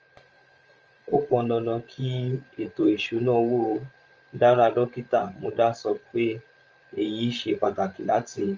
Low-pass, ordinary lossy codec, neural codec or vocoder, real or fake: 7.2 kHz; Opus, 24 kbps; codec, 16 kHz, 16 kbps, FreqCodec, larger model; fake